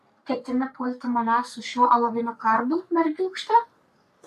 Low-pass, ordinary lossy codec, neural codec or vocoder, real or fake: 14.4 kHz; AAC, 96 kbps; codec, 44.1 kHz, 3.4 kbps, Pupu-Codec; fake